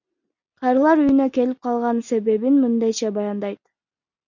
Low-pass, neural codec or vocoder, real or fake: 7.2 kHz; none; real